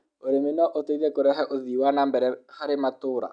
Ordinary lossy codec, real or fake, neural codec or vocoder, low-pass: none; real; none; 9.9 kHz